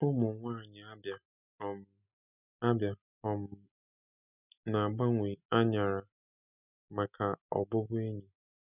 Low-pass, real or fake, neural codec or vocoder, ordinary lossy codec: 3.6 kHz; real; none; none